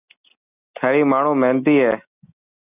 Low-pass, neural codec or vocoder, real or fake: 3.6 kHz; none; real